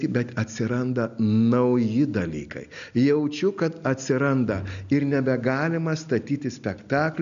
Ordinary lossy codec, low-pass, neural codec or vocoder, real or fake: MP3, 96 kbps; 7.2 kHz; none; real